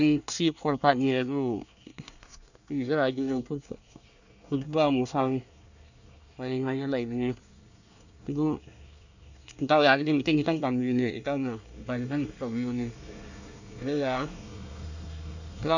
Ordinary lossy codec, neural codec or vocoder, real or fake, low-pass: none; codec, 24 kHz, 1 kbps, SNAC; fake; 7.2 kHz